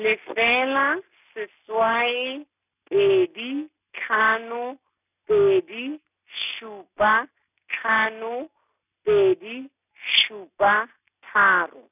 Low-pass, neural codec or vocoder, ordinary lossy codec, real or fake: 3.6 kHz; none; none; real